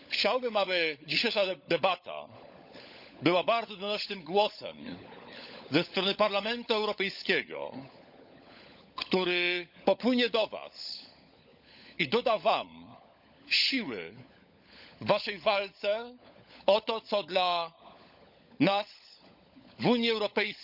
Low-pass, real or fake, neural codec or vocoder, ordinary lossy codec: 5.4 kHz; fake; codec, 16 kHz, 16 kbps, FunCodec, trained on LibriTTS, 50 frames a second; none